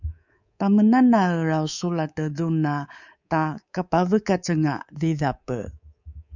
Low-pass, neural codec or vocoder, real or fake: 7.2 kHz; codec, 24 kHz, 3.1 kbps, DualCodec; fake